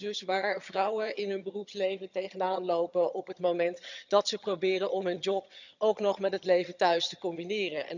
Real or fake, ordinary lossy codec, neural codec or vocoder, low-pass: fake; none; vocoder, 22.05 kHz, 80 mel bands, HiFi-GAN; 7.2 kHz